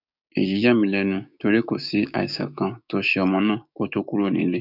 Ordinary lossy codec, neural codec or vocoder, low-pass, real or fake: none; codec, 16 kHz, 6 kbps, DAC; 5.4 kHz; fake